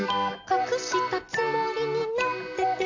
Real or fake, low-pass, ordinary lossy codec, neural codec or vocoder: real; 7.2 kHz; AAC, 48 kbps; none